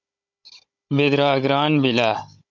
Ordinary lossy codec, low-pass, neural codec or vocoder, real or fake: MP3, 64 kbps; 7.2 kHz; codec, 16 kHz, 16 kbps, FunCodec, trained on Chinese and English, 50 frames a second; fake